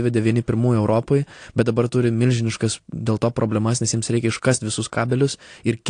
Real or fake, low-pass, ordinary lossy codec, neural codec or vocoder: real; 9.9 kHz; AAC, 48 kbps; none